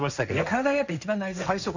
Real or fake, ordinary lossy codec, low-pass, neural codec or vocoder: fake; none; 7.2 kHz; codec, 16 kHz, 1.1 kbps, Voila-Tokenizer